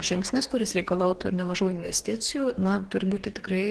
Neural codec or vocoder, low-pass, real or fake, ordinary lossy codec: codec, 44.1 kHz, 2.6 kbps, DAC; 10.8 kHz; fake; Opus, 16 kbps